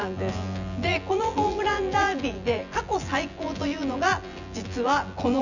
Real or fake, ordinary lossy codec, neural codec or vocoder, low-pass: fake; none; vocoder, 24 kHz, 100 mel bands, Vocos; 7.2 kHz